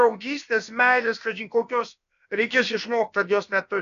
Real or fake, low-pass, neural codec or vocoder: fake; 7.2 kHz; codec, 16 kHz, about 1 kbps, DyCAST, with the encoder's durations